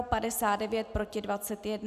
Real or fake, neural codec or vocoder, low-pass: real; none; 14.4 kHz